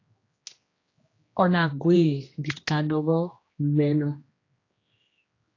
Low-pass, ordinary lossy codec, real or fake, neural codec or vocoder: 7.2 kHz; AAC, 32 kbps; fake; codec, 16 kHz, 1 kbps, X-Codec, HuBERT features, trained on general audio